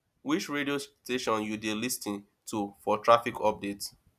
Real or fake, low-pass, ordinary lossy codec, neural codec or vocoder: real; 14.4 kHz; none; none